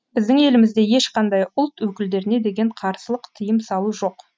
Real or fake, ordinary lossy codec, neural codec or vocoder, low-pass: real; none; none; none